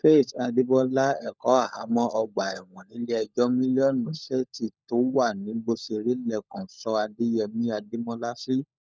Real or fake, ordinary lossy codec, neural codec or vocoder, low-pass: fake; none; codec, 16 kHz, 16 kbps, FunCodec, trained on LibriTTS, 50 frames a second; none